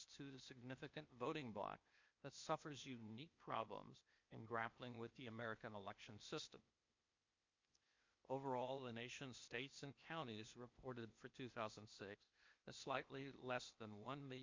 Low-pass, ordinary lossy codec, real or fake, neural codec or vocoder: 7.2 kHz; MP3, 48 kbps; fake; codec, 16 kHz, 0.8 kbps, ZipCodec